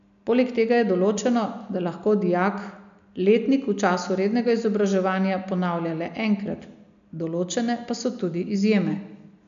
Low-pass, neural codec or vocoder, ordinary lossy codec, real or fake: 7.2 kHz; none; MP3, 96 kbps; real